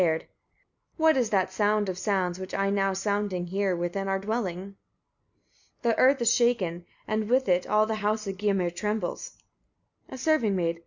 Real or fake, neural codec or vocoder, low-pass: real; none; 7.2 kHz